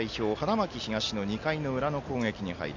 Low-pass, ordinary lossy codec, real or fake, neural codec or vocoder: 7.2 kHz; MP3, 64 kbps; real; none